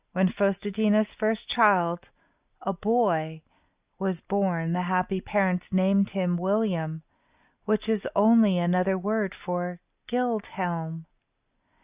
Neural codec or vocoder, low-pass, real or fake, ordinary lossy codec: none; 3.6 kHz; real; Opus, 64 kbps